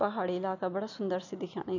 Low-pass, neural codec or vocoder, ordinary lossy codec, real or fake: 7.2 kHz; none; none; real